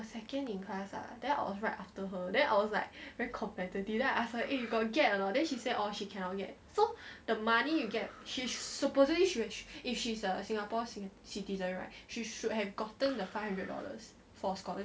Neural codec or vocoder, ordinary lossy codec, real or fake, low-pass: none; none; real; none